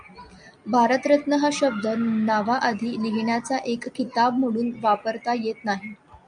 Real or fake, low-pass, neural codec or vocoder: real; 9.9 kHz; none